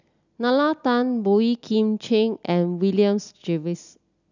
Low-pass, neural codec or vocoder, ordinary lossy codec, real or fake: 7.2 kHz; none; none; real